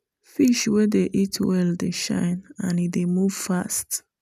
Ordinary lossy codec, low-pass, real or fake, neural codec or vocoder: none; 14.4 kHz; real; none